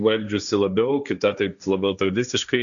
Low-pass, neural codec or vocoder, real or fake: 7.2 kHz; codec, 16 kHz, 1.1 kbps, Voila-Tokenizer; fake